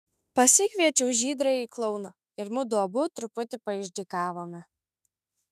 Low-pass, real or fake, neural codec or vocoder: 14.4 kHz; fake; autoencoder, 48 kHz, 32 numbers a frame, DAC-VAE, trained on Japanese speech